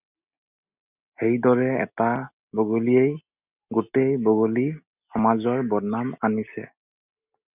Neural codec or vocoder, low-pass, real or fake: none; 3.6 kHz; real